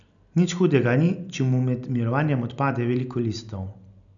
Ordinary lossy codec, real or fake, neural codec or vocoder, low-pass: none; real; none; 7.2 kHz